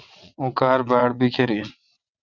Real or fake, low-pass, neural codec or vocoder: fake; 7.2 kHz; vocoder, 22.05 kHz, 80 mel bands, WaveNeXt